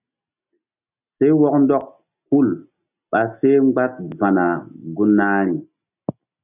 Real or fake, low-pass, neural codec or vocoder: real; 3.6 kHz; none